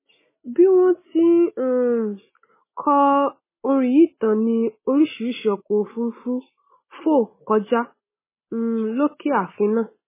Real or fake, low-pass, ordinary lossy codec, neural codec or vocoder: real; 3.6 kHz; MP3, 16 kbps; none